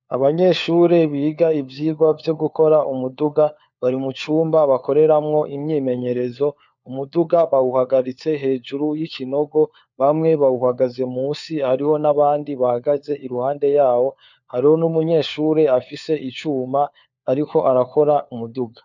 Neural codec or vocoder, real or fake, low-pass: codec, 16 kHz, 4 kbps, FunCodec, trained on LibriTTS, 50 frames a second; fake; 7.2 kHz